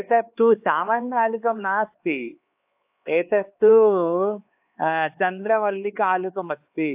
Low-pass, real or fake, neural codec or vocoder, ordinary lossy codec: 3.6 kHz; fake; codec, 16 kHz, 4 kbps, X-Codec, HuBERT features, trained on LibriSpeech; AAC, 32 kbps